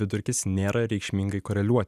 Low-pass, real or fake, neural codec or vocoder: 14.4 kHz; real; none